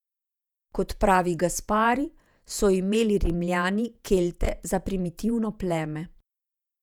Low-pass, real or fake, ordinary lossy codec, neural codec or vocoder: 19.8 kHz; fake; none; vocoder, 48 kHz, 128 mel bands, Vocos